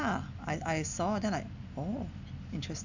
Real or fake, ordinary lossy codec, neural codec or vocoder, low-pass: real; none; none; 7.2 kHz